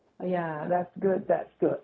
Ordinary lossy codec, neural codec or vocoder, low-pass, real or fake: none; codec, 16 kHz, 0.4 kbps, LongCat-Audio-Codec; none; fake